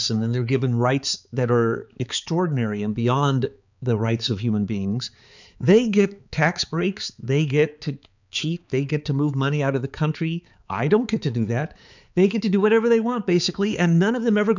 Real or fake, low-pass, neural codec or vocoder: fake; 7.2 kHz; codec, 16 kHz, 4 kbps, X-Codec, HuBERT features, trained on balanced general audio